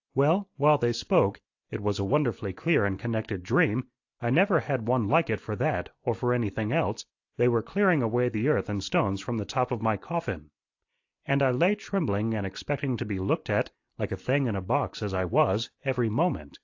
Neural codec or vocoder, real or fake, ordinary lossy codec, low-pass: none; real; AAC, 48 kbps; 7.2 kHz